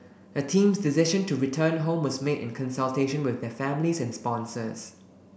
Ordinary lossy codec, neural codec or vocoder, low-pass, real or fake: none; none; none; real